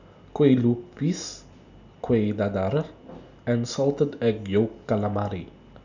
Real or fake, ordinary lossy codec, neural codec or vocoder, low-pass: real; none; none; 7.2 kHz